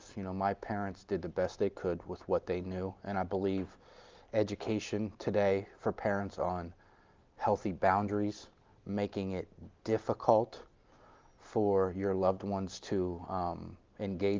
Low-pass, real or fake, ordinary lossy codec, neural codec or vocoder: 7.2 kHz; real; Opus, 32 kbps; none